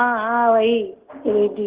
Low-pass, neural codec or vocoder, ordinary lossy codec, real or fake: 3.6 kHz; none; Opus, 16 kbps; real